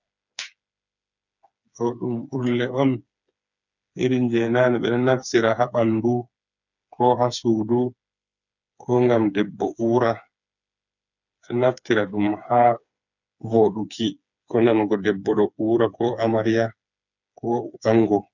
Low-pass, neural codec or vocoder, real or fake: 7.2 kHz; codec, 16 kHz, 4 kbps, FreqCodec, smaller model; fake